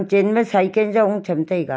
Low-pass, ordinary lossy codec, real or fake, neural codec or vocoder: none; none; real; none